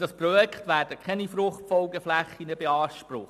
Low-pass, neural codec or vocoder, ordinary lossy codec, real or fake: 14.4 kHz; none; AAC, 96 kbps; real